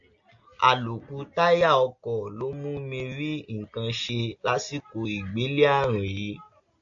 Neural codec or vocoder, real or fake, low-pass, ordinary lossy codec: none; real; 7.2 kHz; AAC, 64 kbps